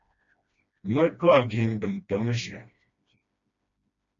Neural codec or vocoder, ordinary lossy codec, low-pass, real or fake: codec, 16 kHz, 1 kbps, FreqCodec, smaller model; MP3, 48 kbps; 7.2 kHz; fake